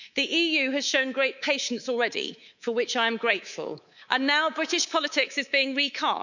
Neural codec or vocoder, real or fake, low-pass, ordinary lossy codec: codec, 24 kHz, 3.1 kbps, DualCodec; fake; 7.2 kHz; none